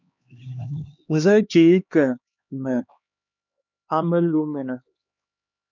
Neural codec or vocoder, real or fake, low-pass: codec, 16 kHz, 2 kbps, X-Codec, HuBERT features, trained on LibriSpeech; fake; 7.2 kHz